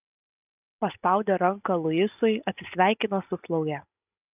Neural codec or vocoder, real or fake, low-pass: none; real; 3.6 kHz